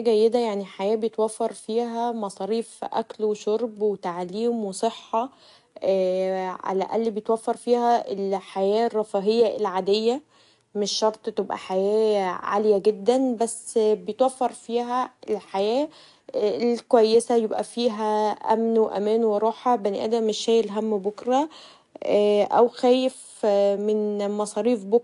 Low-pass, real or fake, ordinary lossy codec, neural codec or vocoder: 10.8 kHz; real; AAC, 64 kbps; none